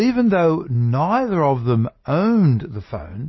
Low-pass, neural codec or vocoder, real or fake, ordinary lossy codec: 7.2 kHz; none; real; MP3, 24 kbps